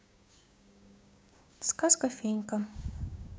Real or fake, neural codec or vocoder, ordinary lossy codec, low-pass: fake; codec, 16 kHz, 6 kbps, DAC; none; none